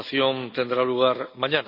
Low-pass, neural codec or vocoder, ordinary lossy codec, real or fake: 5.4 kHz; none; none; real